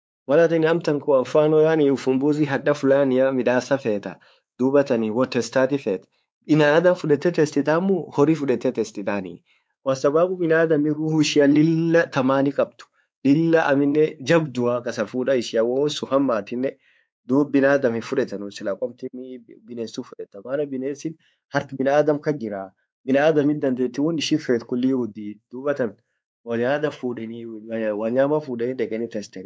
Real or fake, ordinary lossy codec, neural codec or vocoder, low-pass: fake; none; codec, 16 kHz, 4 kbps, X-Codec, WavLM features, trained on Multilingual LibriSpeech; none